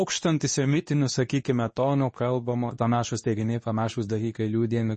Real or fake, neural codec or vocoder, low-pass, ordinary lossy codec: fake; codec, 24 kHz, 0.9 kbps, WavTokenizer, medium speech release version 2; 10.8 kHz; MP3, 32 kbps